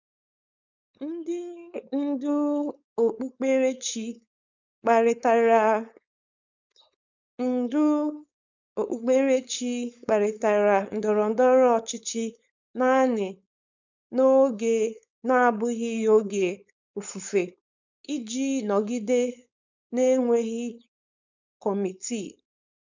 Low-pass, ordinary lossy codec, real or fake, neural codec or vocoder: 7.2 kHz; none; fake; codec, 16 kHz, 4.8 kbps, FACodec